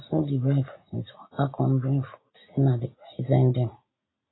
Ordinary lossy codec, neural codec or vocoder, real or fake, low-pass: AAC, 16 kbps; none; real; 7.2 kHz